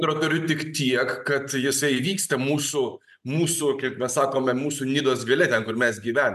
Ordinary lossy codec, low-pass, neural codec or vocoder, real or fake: MP3, 96 kbps; 14.4 kHz; none; real